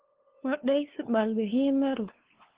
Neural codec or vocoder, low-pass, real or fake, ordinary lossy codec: codec, 16 kHz, 2 kbps, FunCodec, trained on LibriTTS, 25 frames a second; 3.6 kHz; fake; Opus, 16 kbps